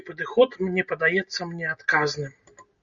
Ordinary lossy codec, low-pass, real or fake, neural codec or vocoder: Opus, 64 kbps; 7.2 kHz; real; none